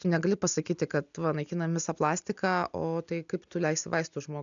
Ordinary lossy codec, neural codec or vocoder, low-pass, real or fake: MP3, 96 kbps; none; 7.2 kHz; real